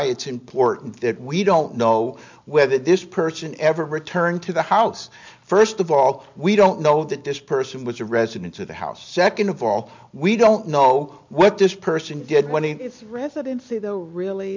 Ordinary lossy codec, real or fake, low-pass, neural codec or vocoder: MP3, 64 kbps; real; 7.2 kHz; none